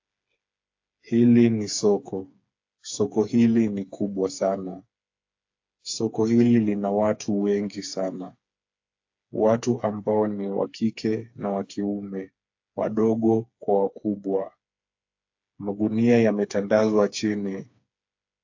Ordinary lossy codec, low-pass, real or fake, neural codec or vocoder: AAC, 48 kbps; 7.2 kHz; fake; codec, 16 kHz, 4 kbps, FreqCodec, smaller model